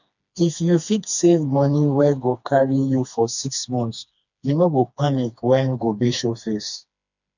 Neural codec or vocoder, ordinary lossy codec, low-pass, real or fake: codec, 16 kHz, 2 kbps, FreqCodec, smaller model; none; 7.2 kHz; fake